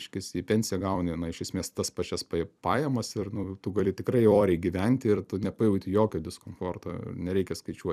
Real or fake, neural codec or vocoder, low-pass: fake; vocoder, 44.1 kHz, 128 mel bands every 256 samples, BigVGAN v2; 14.4 kHz